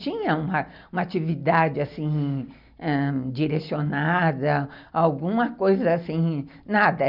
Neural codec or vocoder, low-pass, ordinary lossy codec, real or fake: none; 5.4 kHz; none; real